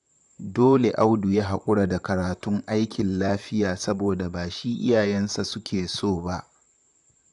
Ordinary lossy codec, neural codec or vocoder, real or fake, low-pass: none; vocoder, 44.1 kHz, 128 mel bands, Pupu-Vocoder; fake; 10.8 kHz